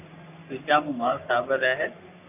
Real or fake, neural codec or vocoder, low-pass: fake; codec, 44.1 kHz, 3.4 kbps, Pupu-Codec; 3.6 kHz